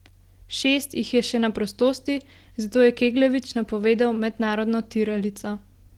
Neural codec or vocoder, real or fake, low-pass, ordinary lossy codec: none; real; 19.8 kHz; Opus, 16 kbps